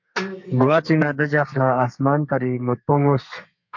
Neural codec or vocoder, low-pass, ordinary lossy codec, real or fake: codec, 32 kHz, 1.9 kbps, SNAC; 7.2 kHz; MP3, 48 kbps; fake